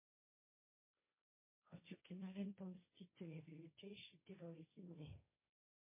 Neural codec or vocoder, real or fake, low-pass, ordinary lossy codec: codec, 16 kHz, 1.1 kbps, Voila-Tokenizer; fake; 3.6 kHz; MP3, 24 kbps